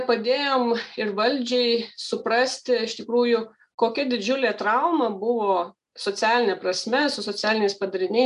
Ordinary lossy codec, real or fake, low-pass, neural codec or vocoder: AAC, 96 kbps; real; 10.8 kHz; none